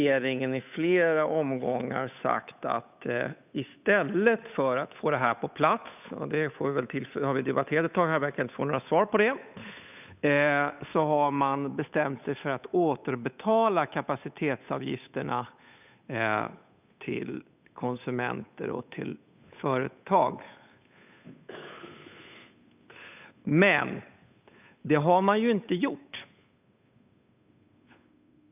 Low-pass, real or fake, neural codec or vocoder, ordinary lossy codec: 3.6 kHz; fake; codec, 16 kHz, 8 kbps, FunCodec, trained on Chinese and English, 25 frames a second; none